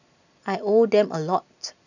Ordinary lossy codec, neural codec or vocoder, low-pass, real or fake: none; none; 7.2 kHz; real